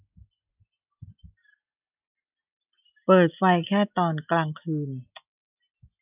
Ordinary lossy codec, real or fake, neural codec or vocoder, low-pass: none; real; none; 3.6 kHz